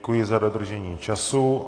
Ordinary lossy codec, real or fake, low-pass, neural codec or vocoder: AAC, 32 kbps; real; 9.9 kHz; none